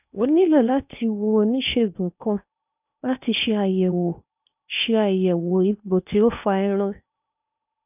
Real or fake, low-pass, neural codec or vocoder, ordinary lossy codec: fake; 3.6 kHz; codec, 16 kHz in and 24 kHz out, 0.8 kbps, FocalCodec, streaming, 65536 codes; none